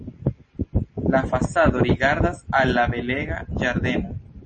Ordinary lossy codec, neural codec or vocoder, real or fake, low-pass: MP3, 32 kbps; none; real; 10.8 kHz